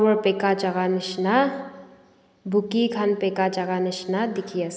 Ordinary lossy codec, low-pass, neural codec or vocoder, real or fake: none; none; none; real